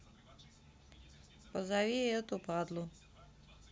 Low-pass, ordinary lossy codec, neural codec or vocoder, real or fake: none; none; none; real